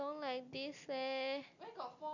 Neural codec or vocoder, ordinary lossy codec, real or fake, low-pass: none; none; real; 7.2 kHz